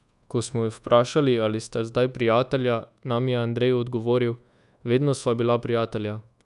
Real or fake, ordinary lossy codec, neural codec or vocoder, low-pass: fake; none; codec, 24 kHz, 1.2 kbps, DualCodec; 10.8 kHz